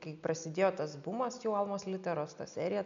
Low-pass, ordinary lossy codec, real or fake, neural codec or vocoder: 7.2 kHz; MP3, 64 kbps; real; none